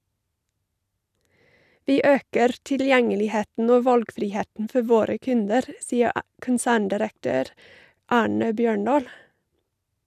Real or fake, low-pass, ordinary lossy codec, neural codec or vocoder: real; 14.4 kHz; none; none